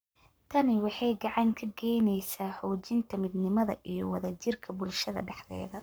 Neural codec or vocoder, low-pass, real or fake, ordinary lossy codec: codec, 44.1 kHz, 7.8 kbps, Pupu-Codec; none; fake; none